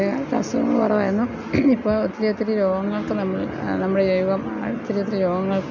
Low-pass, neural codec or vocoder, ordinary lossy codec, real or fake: 7.2 kHz; none; MP3, 64 kbps; real